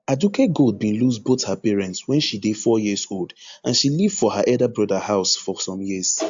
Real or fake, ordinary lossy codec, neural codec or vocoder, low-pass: real; AAC, 64 kbps; none; 7.2 kHz